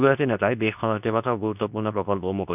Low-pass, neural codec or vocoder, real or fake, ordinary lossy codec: 3.6 kHz; codec, 24 kHz, 0.9 kbps, WavTokenizer, medium speech release version 2; fake; none